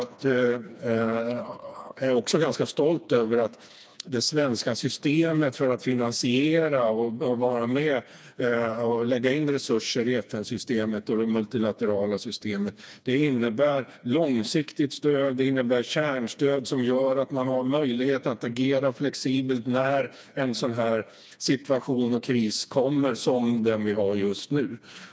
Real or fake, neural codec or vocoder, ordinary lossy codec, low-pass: fake; codec, 16 kHz, 2 kbps, FreqCodec, smaller model; none; none